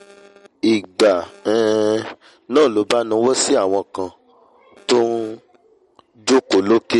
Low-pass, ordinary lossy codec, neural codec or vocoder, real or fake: 14.4 kHz; MP3, 48 kbps; none; real